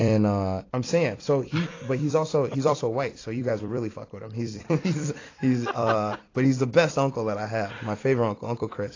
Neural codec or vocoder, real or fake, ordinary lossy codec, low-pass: vocoder, 44.1 kHz, 128 mel bands every 256 samples, BigVGAN v2; fake; AAC, 32 kbps; 7.2 kHz